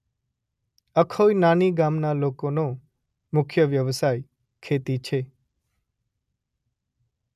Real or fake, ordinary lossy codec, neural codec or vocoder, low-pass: real; none; none; 14.4 kHz